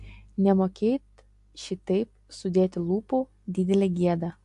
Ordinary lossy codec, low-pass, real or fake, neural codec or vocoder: AAC, 64 kbps; 10.8 kHz; real; none